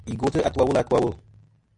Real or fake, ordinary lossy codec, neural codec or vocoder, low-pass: real; MP3, 48 kbps; none; 9.9 kHz